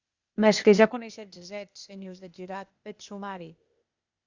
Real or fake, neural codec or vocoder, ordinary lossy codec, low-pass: fake; codec, 16 kHz, 0.8 kbps, ZipCodec; Opus, 64 kbps; 7.2 kHz